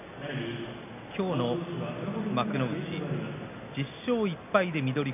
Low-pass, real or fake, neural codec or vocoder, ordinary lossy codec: 3.6 kHz; real; none; none